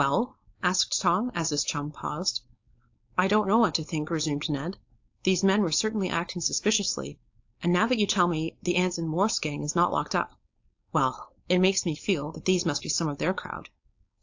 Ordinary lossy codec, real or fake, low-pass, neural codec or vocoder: AAC, 48 kbps; fake; 7.2 kHz; codec, 16 kHz, 4.8 kbps, FACodec